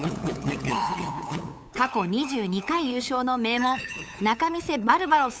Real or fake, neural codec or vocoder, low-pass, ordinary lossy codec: fake; codec, 16 kHz, 8 kbps, FunCodec, trained on LibriTTS, 25 frames a second; none; none